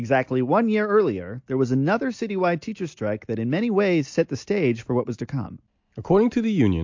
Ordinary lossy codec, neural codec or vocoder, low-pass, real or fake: MP3, 48 kbps; none; 7.2 kHz; real